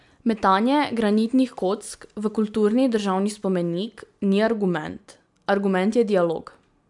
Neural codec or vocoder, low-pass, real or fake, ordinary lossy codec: none; 10.8 kHz; real; MP3, 96 kbps